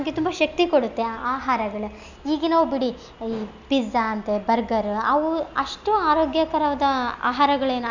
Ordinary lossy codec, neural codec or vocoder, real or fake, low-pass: none; none; real; 7.2 kHz